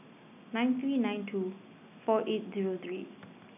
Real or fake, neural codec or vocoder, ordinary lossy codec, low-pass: real; none; none; 3.6 kHz